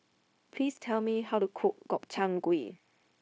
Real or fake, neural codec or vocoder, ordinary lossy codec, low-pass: fake; codec, 16 kHz, 0.9 kbps, LongCat-Audio-Codec; none; none